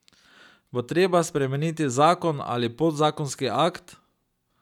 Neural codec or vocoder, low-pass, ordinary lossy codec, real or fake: none; 19.8 kHz; none; real